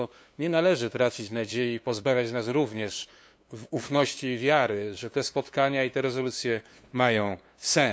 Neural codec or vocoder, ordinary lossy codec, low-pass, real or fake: codec, 16 kHz, 2 kbps, FunCodec, trained on LibriTTS, 25 frames a second; none; none; fake